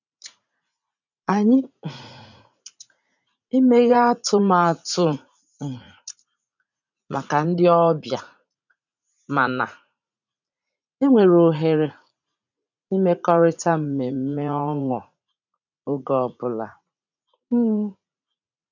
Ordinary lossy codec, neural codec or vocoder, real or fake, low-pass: none; vocoder, 44.1 kHz, 80 mel bands, Vocos; fake; 7.2 kHz